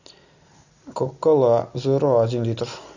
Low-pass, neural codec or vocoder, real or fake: 7.2 kHz; none; real